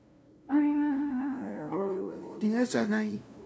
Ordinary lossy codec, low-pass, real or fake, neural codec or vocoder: none; none; fake; codec, 16 kHz, 0.5 kbps, FunCodec, trained on LibriTTS, 25 frames a second